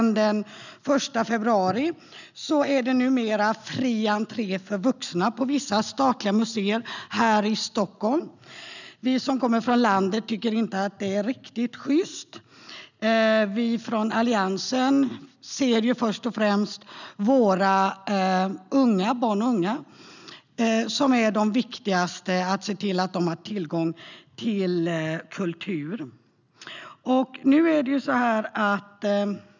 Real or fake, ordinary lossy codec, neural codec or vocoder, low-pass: real; none; none; 7.2 kHz